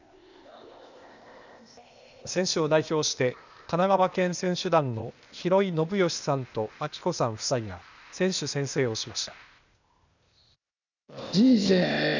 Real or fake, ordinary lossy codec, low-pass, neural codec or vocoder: fake; none; 7.2 kHz; codec, 16 kHz, 0.8 kbps, ZipCodec